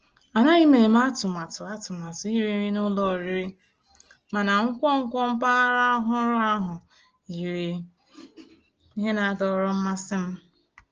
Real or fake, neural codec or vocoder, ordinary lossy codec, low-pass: real; none; Opus, 16 kbps; 7.2 kHz